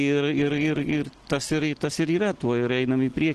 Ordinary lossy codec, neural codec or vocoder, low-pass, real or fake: Opus, 16 kbps; none; 10.8 kHz; real